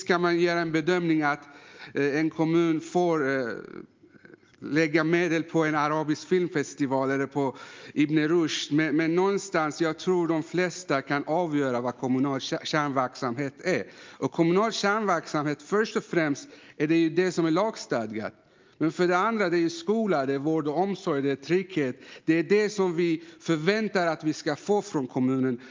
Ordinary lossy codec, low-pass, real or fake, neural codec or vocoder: Opus, 24 kbps; 7.2 kHz; real; none